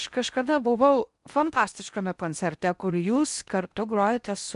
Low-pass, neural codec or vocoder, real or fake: 10.8 kHz; codec, 16 kHz in and 24 kHz out, 0.8 kbps, FocalCodec, streaming, 65536 codes; fake